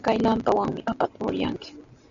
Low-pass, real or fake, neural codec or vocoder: 7.2 kHz; real; none